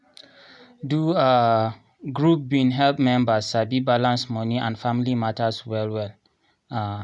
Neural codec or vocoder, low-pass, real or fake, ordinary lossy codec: none; 10.8 kHz; real; none